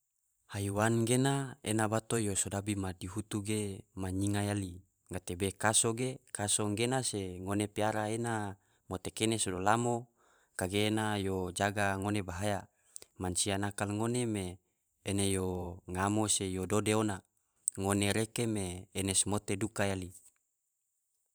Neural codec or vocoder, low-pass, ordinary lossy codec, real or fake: vocoder, 44.1 kHz, 128 mel bands every 512 samples, BigVGAN v2; none; none; fake